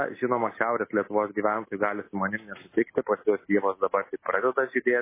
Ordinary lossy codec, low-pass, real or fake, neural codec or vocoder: MP3, 16 kbps; 3.6 kHz; real; none